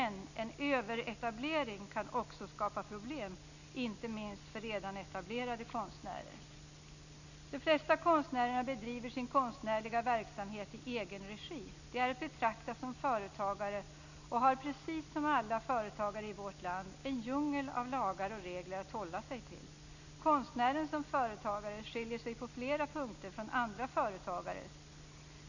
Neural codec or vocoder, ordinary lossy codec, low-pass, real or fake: none; none; 7.2 kHz; real